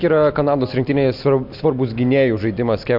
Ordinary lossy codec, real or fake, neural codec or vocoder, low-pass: MP3, 48 kbps; real; none; 5.4 kHz